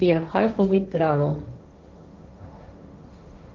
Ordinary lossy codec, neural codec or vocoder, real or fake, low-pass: Opus, 24 kbps; codec, 16 kHz, 1.1 kbps, Voila-Tokenizer; fake; 7.2 kHz